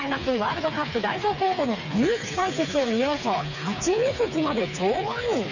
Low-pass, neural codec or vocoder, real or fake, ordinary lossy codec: 7.2 kHz; codec, 16 kHz, 4 kbps, FreqCodec, smaller model; fake; none